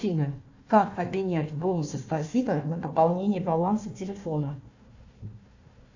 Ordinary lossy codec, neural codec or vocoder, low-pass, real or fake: AAC, 48 kbps; codec, 16 kHz, 1 kbps, FunCodec, trained on Chinese and English, 50 frames a second; 7.2 kHz; fake